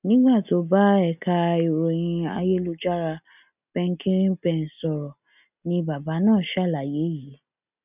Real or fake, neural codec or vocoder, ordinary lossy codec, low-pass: real; none; none; 3.6 kHz